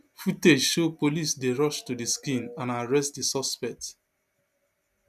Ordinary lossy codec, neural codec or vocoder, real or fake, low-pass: none; none; real; 14.4 kHz